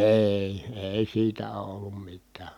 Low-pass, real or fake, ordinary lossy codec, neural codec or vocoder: 19.8 kHz; real; none; none